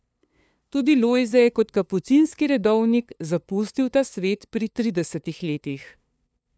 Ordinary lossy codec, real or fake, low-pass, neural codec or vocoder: none; fake; none; codec, 16 kHz, 2 kbps, FunCodec, trained on LibriTTS, 25 frames a second